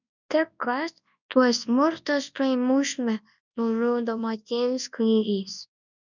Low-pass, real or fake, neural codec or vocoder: 7.2 kHz; fake; codec, 24 kHz, 0.9 kbps, WavTokenizer, large speech release